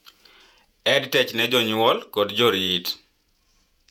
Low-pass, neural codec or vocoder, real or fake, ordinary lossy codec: 19.8 kHz; vocoder, 48 kHz, 128 mel bands, Vocos; fake; none